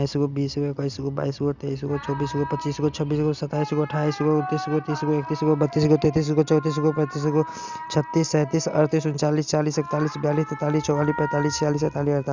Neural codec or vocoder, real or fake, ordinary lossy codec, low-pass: none; real; Opus, 64 kbps; 7.2 kHz